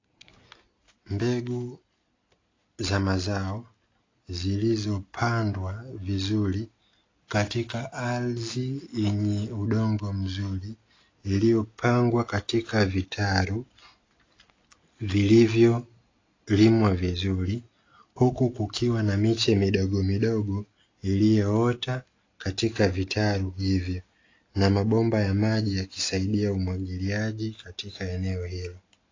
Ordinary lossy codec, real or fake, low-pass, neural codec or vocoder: AAC, 32 kbps; real; 7.2 kHz; none